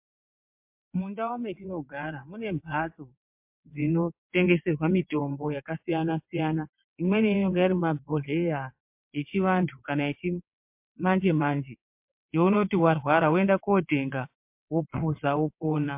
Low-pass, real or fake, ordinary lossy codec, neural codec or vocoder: 3.6 kHz; fake; MP3, 32 kbps; vocoder, 22.05 kHz, 80 mel bands, WaveNeXt